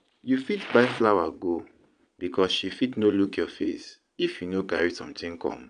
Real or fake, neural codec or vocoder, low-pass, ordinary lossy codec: fake; vocoder, 22.05 kHz, 80 mel bands, Vocos; 9.9 kHz; none